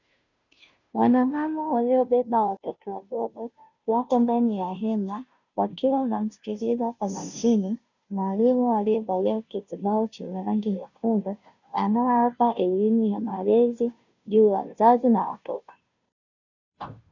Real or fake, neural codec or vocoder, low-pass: fake; codec, 16 kHz, 0.5 kbps, FunCodec, trained on Chinese and English, 25 frames a second; 7.2 kHz